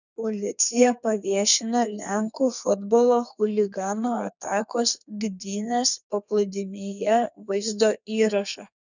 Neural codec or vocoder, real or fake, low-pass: codec, 32 kHz, 1.9 kbps, SNAC; fake; 7.2 kHz